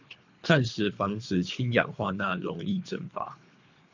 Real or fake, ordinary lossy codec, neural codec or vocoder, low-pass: fake; MP3, 64 kbps; codec, 24 kHz, 3 kbps, HILCodec; 7.2 kHz